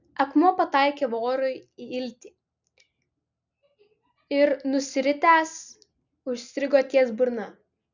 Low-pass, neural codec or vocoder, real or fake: 7.2 kHz; none; real